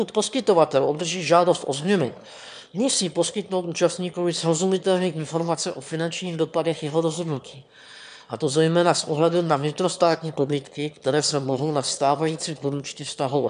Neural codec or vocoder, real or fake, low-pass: autoencoder, 22.05 kHz, a latent of 192 numbers a frame, VITS, trained on one speaker; fake; 9.9 kHz